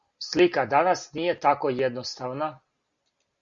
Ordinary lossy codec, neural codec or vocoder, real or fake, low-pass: Opus, 64 kbps; none; real; 7.2 kHz